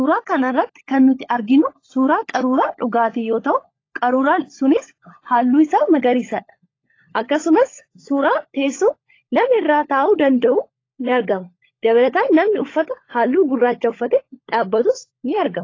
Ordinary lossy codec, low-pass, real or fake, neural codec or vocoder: AAC, 32 kbps; 7.2 kHz; fake; codec, 16 kHz, 16 kbps, FunCodec, trained on LibriTTS, 50 frames a second